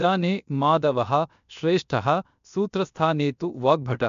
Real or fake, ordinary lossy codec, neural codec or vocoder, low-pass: fake; MP3, 64 kbps; codec, 16 kHz, about 1 kbps, DyCAST, with the encoder's durations; 7.2 kHz